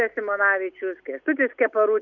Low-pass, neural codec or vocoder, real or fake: 7.2 kHz; none; real